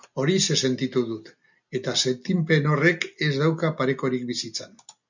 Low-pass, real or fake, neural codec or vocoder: 7.2 kHz; real; none